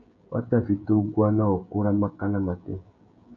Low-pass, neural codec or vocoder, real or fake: 7.2 kHz; codec, 16 kHz, 16 kbps, FreqCodec, smaller model; fake